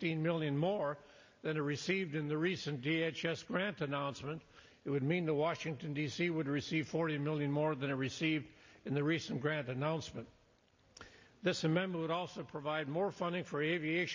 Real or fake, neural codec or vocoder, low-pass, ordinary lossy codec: real; none; 7.2 kHz; MP3, 32 kbps